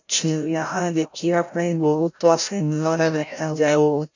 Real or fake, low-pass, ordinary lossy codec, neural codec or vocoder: fake; 7.2 kHz; none; codec, 16 kHz, 0.5 kbps, FreqCodec, larger model